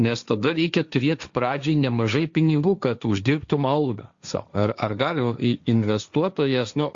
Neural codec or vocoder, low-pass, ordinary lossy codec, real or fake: codec, 16 kHz, 1.1 kbps, Voila-Tokenizer; 7.2 kHz; Opus, 64 kbps; fake